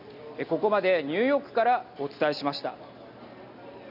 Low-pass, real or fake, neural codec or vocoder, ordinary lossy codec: 5.4 kHz; real; none; none